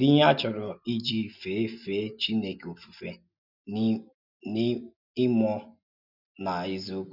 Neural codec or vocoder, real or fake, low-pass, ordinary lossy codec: none; real; 5.4 kHz; none